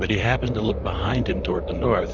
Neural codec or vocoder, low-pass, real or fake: vocoder, 44.1 kHz, 128 mel bands, Pupu-Vocoder; 7.2 kHz; fake